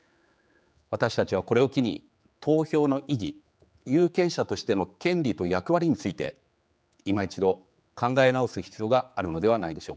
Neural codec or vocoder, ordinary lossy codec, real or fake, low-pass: codec, 16 kHz, 4 kbps, X-Codec, HuBERT features, trained on general audio; none; fake; none